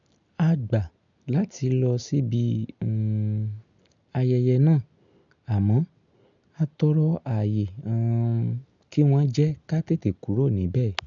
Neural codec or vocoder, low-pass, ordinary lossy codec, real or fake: none; 7.2 kHz; none; real